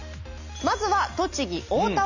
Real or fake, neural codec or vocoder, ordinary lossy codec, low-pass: real; none; none; 7.2 kHz